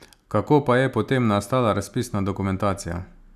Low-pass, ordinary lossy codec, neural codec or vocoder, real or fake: 14.4 kHz; none; none; real